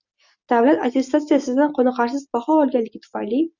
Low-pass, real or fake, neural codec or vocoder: 7.2 kHz; real; none